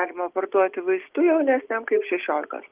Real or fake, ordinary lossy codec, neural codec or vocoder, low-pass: real; Opus, 32 kbps; none; 3.6 kHz